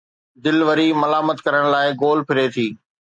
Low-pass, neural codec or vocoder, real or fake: 9.9 kHz; none; real